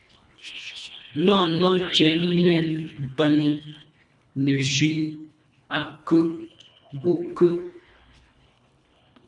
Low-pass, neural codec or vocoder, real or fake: 10.8 kHz; codec, 24 kHz, 1.5 kbps, HILCodec; fake